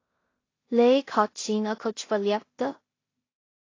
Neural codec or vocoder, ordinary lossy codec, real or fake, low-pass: codec, 16 kHz in and 24 kHz out, 0.4 kbps, LongCat-Audio-Codec, two codebook decoder; AAC, 32 kbps; fake; 7.2 kHz